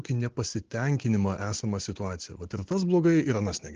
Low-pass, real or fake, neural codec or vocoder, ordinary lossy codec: 7.2 kHz; real; none; Opus, 16 kbps